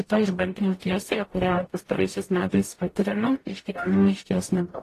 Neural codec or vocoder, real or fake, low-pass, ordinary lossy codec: codec, 44.1 kHz, 0.9 kbps, DAC; fake; 14.4 kHz; AAC, 48 kbps